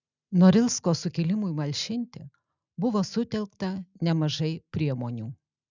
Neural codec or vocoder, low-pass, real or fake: none; 7.2 kHz; real